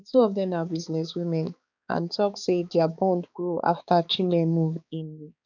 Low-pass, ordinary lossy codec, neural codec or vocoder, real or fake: 7.2 kHz; none; codec, 16 kHz, 4 kbps, X-Codec, HuBERT features, trained on balanced general audio; fake